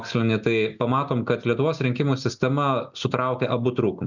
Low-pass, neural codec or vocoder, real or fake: 7.2 kHz; none; real